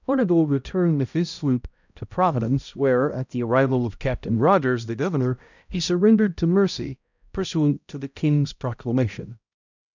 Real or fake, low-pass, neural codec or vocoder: fake; 7.2 kHz; codec, 16 kHz, 0.5 kbps, X-Codec, HuBERT features, trained on balanced general audio